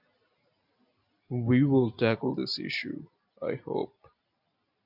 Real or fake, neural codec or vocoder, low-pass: real; none; 5.4 kHz